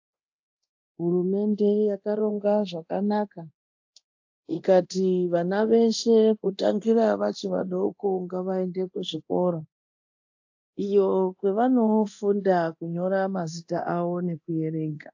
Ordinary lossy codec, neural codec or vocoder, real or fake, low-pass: AAC, 48 kbps; codec, 24 kHz, 0.9 kbps, DualCodec; fake; 7.2 kHz